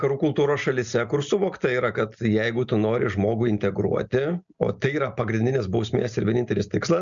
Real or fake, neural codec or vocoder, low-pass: real; none; 7.2 kHz